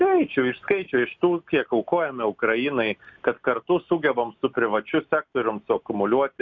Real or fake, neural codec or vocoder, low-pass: real; none; 7.2 kHz